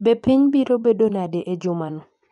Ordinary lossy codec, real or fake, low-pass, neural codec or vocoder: none; fake; 10.8 kHz; vocoder, 24 kHz, 100 mel bands, Vocos